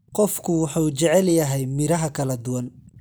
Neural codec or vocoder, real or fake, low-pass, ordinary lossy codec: none; real; none; none